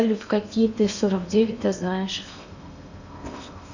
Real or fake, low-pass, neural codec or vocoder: fake; 7.2 kHz; codec, 16 kHz in and 24 kHz out, 0.8 kbps, FocalCodec, streaming, 65536 codes